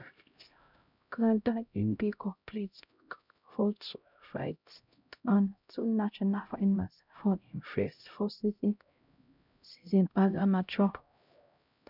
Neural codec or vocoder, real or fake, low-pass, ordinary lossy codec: codec, 16 kHz, 0.5 kbps, X-Codec, HuBERT features, trained on LibriSpeech; fake; 5.4 kHz; none